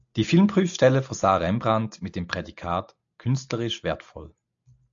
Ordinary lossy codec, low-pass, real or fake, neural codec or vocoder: AAC, 64 kbps; 7.2 kHz; real; none